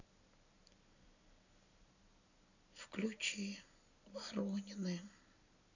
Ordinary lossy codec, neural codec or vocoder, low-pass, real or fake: none; none; 7.2 kHz; real